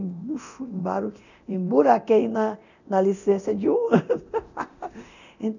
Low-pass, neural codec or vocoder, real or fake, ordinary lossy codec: 7.2 kHz; codec, 24 kHz, 0.9 kbps, DualCodec; fake; none